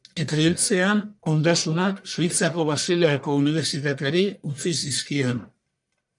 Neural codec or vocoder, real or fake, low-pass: codec, 44.1 kHz, 1.7 kbps, Pupu-Codec; fake; 10.8 kHz